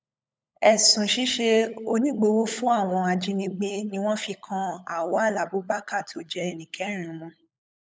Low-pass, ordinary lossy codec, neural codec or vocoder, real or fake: none; none; codec, 16 kHz, 16 kbps, FunCodec, trained on LibriTTS, 50 frames a second; fake